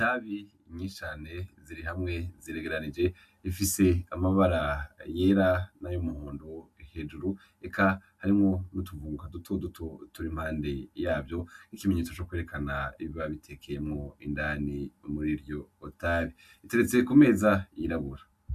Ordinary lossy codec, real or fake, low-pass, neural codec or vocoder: MP3, 96 kbps; real; 14.4 kHz; none